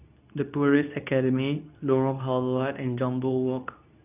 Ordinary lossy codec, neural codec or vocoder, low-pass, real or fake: none; codec, 24 kHz, 0.9 kbps, WavTokenizer, medium speech release version 2; 3.6 kHz; fake